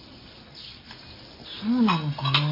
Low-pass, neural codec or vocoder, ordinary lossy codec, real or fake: 5.4 kHz; none; MP3, 24 kbps; real